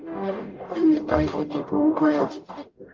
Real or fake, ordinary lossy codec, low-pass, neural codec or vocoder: fake; Opus, 24 kbps; 7.2 kHz; codec, 44.1 kHz, 0.9 kbps, DAC